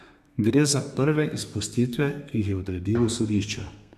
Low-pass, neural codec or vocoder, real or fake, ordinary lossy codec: 14.4 kHz; codec, 32 kHz, 1.9 kbps, SNAC; fake; none